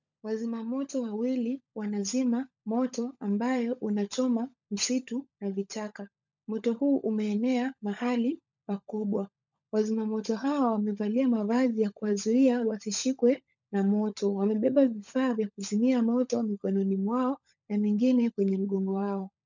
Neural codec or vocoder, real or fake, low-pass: codec, 16 kHz, 16 kbps, FunCodec, trained on LibriTTS, 50 frames a second; fake; 7.2 kHz